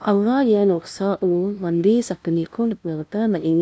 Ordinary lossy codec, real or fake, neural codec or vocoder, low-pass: none; fake; codec, 16 kHz, 0.5 kbps, FunCodec, trained on LibriTTS, 25 frames a second; none